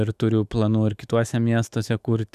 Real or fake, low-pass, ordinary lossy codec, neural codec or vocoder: fake; 14.4 kHz; Opus, 64 kbps; autoencoder, 48 kHz, 128 numbers a frame, DAC-VAE, trained on Japanese speech